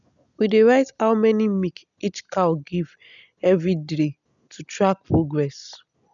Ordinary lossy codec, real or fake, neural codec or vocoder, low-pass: none; real; none; 7.2 kHz